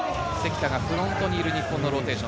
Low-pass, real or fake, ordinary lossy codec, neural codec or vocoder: none; real; none; none